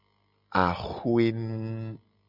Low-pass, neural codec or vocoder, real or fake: 5.4 kHz; none; real